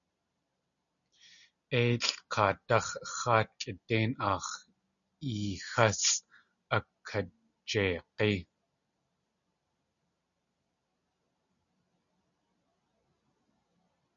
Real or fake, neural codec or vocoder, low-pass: real; none; 7.2 kHz